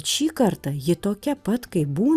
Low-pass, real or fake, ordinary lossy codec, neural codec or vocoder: 14.4 kHz; real; Opus, 64 kbps; none